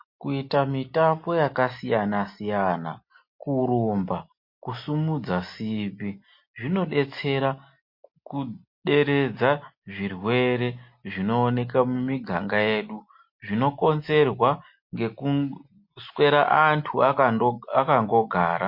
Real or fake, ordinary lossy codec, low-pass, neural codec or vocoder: real; MP3, 32 kbps; 5.4 kHz; none